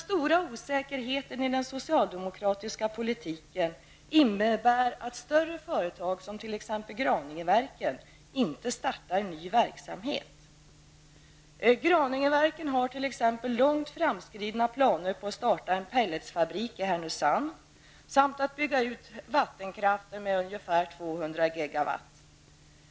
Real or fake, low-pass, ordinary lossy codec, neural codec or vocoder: real; none; none; none